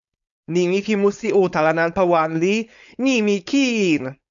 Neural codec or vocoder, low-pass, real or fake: codec, 16 kHz, 4.8 kbps, FACodec; 7.2 kHz; fake